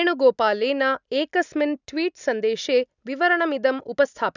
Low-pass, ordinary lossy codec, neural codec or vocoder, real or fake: 7.2 kHz; none; none; real